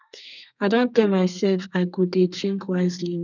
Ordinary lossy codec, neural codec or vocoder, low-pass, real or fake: none; codec, 32 kHz, 1.9 kbps, SNAC; 7.2 kHz; fake